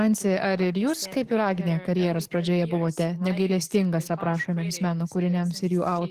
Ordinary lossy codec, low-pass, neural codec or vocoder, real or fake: Opus, 16 kbps; 14.4 kHz; codec, 44.1 kHz, 7.8 kbps, DAC; fake